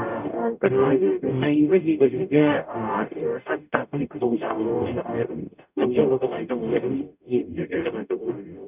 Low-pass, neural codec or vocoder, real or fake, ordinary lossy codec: 3.6 kHz; codec, 44.1 kHz, 0.9 kbps, DAC; fake; none